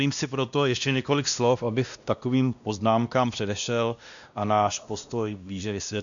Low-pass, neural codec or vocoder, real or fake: 7.2 kHz; codec, 16 kHz, 1 kbps, X-Codec, WavLM features, trained on Multilingual LibriSpeech; fake